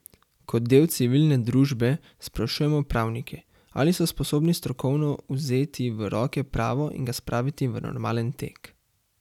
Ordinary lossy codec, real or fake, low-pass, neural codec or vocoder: none; real; 19.8 kHz; none